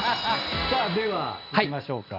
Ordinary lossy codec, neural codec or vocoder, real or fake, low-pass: none; none; real; 5.4 kHz